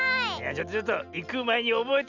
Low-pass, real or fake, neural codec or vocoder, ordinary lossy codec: 7.2 kHz; real; none; none